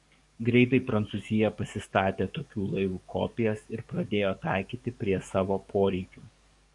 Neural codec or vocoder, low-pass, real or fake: codec, 44.1 kHz, 7.8 kbps, DAC; 10.8 kHz; fake